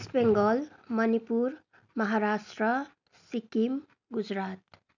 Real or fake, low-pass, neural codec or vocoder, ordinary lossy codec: real; 7.2 kHz; none; none